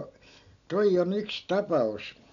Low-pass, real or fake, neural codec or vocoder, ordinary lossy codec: 7.2 kHz; real; none; none